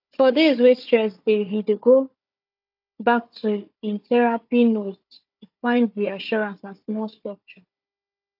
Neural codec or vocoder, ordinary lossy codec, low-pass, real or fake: codec, 16 kHz, 4 kbps, FunCodec, trained on Chinese and English, 50 frames a second; AAC, 48 kbps; 5.4 kHz; fake